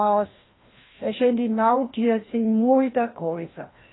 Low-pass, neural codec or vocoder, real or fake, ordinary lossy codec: 7.2 kHz; codec, 16 kHz, 1 kbps, FreqCodec, larger model; fake; AAC, 16 kbps